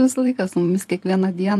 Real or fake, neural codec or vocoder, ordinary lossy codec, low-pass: fake; vocoder, 44.1 kHz, 128 mel bands every 512 samples, BigVGAN v2; AAC, 64 kbps; 14.4 kHz